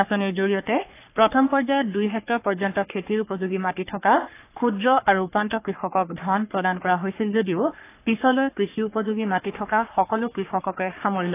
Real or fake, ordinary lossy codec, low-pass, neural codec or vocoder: fake; AAC, 24 kbps; 3.6 kHz; codec, 44.1 kHz, 3.4 kbps, Pupu-Codec